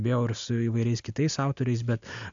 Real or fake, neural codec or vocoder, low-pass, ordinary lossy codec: real; none; 7.2 kHz; MP3, 64 kbps